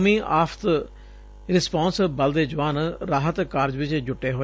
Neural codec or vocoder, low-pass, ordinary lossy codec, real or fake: none; none; none; real